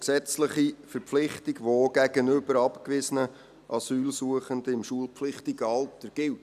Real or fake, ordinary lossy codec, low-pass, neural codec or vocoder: real; none; 14.4 kHz; none